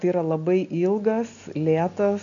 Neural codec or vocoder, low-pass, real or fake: none; 7.2 kHz; real